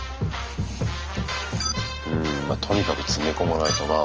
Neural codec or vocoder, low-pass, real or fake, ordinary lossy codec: none; 7.2 kHz; real; Opus, 24 kbps